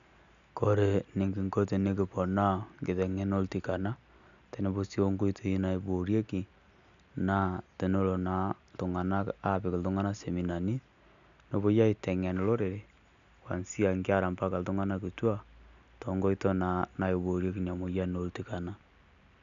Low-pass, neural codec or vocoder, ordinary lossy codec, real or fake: 7.2 kHz; none; none; real